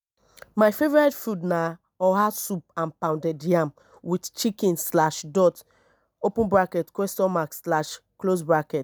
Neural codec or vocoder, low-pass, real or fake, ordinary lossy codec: none; none; real; none